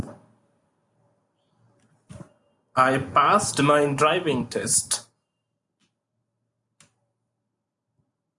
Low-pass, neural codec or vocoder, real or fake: 10.8 kHz; none; real